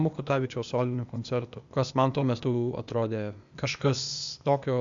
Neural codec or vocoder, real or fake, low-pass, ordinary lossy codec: codec, 16 kHz, 0.8 kbps, ZipCodec; fake; 7.2 kHz; Opus, 64 kbps